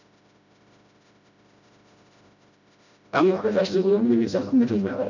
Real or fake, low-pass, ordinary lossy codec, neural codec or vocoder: fake; 7.2 kHz; none; codec, 16 kHz, 0.5 kbps, FreqCodec, smaller model